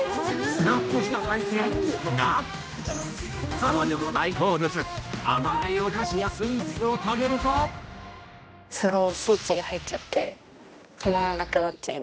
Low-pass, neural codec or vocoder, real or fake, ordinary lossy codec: none; codec, 16 kHz, 1 kbps, X-Codec, HuBERT features, trained on general audio; fake; none